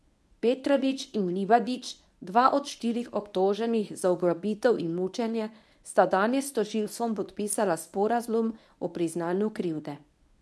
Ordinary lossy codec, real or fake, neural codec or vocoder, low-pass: none; fake; codec, 24 kHz, 0.9 kbps, WavTokenizer, medium speech release version 1; none